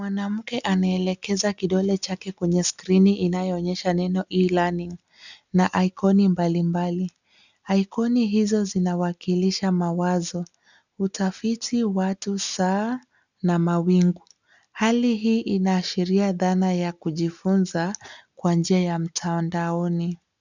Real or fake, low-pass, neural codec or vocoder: real; 7.2 kHz; none